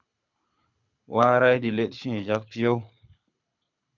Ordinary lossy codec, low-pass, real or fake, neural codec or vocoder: MP3, 64 kbps; 7.2 kHz; fake; codec, 24 kHz, 6 kbps, HILCodec